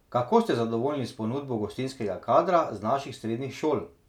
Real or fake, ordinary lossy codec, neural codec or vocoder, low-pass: real; none; none; 19.8 kHz